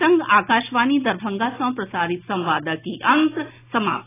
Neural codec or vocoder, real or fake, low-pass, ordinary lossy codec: none; real; 3.6 kHz; AAC, 16 kbps